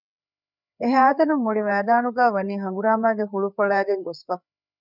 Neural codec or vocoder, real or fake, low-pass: codec, 16 kHz, 4 kbps, FreqCodec, larger model; fake; 5.4 kHz